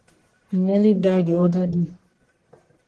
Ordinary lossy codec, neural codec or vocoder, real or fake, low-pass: Opus, 16 kbps; codec, 44.1 kHz, 1.7 kbps, Pupu-Codec; fake; 10.8 kHz